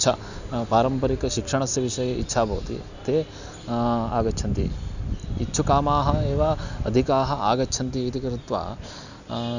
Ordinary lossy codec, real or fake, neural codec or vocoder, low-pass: none; real; none; 7.2 kHz